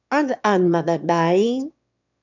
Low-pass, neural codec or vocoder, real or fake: 7.2 kHz; autoencoder, 22.05 kHz, a latent of 192 numbers a frame, VITS, trained on one speaker; fake